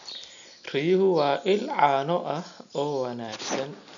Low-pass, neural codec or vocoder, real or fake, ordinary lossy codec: 7.2 kHz; none; real; none